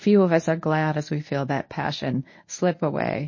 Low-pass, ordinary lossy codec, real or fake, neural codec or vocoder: 7.2 kHz; MP3, 32 kbps; fake; codec, 24 kHz, 0.9 kbps, WavTokenizer, medium speech release version 1